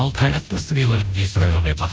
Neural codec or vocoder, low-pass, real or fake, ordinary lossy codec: codec, 16 kHz, 0.5 kbps, FunCodec, trained on Chinese and English, 25 frames a second; none; fake; none